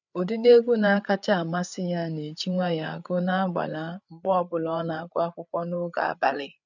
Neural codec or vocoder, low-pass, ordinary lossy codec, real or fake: codec, 16 kHz, 8 kbps, FreqCodec, larger model; 7.2 kHz; none; fake